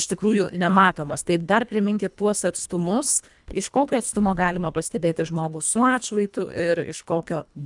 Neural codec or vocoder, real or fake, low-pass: codec, 24 kHz, 1.5 kbps, HILCodec; fake; 10.8 kHz